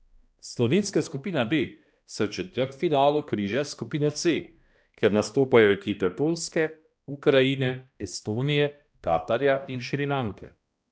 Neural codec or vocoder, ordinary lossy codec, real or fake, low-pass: codec, 16 kHz, 1 kbps, X-Codec, HuBERT features, trained on balanced general audio; none; fake; none